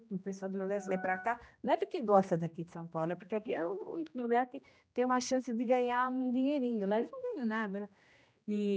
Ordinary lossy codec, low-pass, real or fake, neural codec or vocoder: none; none; fake; codec, 16 kHz, 1 kbps, X-Codec, HuBERT features, trained on general audio